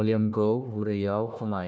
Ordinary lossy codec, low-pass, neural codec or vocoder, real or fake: none; none; codec, 16 kHz, 1 kbps, FunCodec, trained on Chinese and English, 50 frames a second; fake